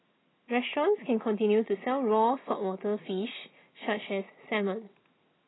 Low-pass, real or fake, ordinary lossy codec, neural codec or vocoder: 7.2 kHz; real; AAC, 16 kbps; none